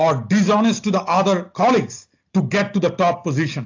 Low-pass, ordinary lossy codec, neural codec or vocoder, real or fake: 7.2 kHz; AAC, 48 kbps; none; real